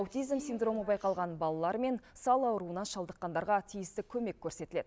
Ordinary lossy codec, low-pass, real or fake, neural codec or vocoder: none; none; real; none